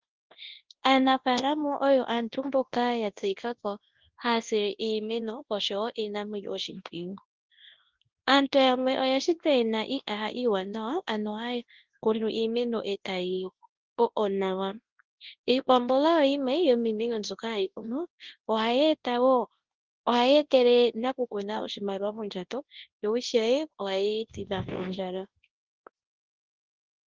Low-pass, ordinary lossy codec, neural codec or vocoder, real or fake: 7.2 kHz; Opus, 16 kbps; codec, 24 kHz, 0.9 kbps, WavTokenizer, large speech release; fake